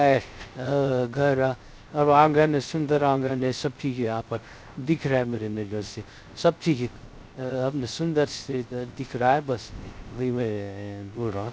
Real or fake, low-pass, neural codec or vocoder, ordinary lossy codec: fake; none; codec, 16 kHz, 0.3 kbps, FocalCodec; none